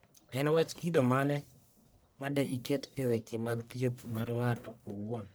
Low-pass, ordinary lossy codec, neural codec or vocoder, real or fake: none; none; codec, 44.1 kHz, 1.7 kbps, Pupu-Codec; fake